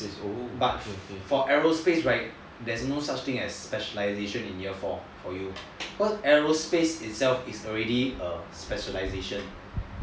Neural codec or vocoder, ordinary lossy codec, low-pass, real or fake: none; none; none; real